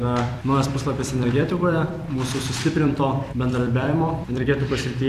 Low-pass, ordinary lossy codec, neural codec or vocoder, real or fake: 14.4 kHz; AAC, 96 kbps; vocoder, 44.1 kHz, 128 mel bands every 256 samples, BigVGAN v2; fake